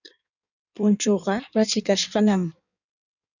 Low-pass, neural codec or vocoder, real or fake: 7.2 kHz; codec, 16 kHz in and 24 kHz out, 1.1 kbps, FireRedTTS-2 codec; fake